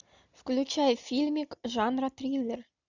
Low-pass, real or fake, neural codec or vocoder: 7.2 kHz; real; none